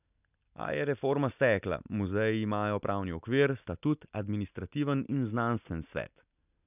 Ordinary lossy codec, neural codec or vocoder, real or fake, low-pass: none; none; real; 3.6 kHz